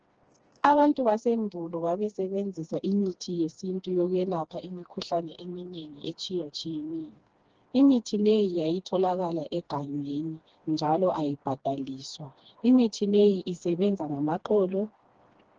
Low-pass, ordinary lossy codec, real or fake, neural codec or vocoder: 7.2 kHz; Opus, 16 kbps; fake; codec, 16 kHz, 2 kbps, FreqCodec, smaller model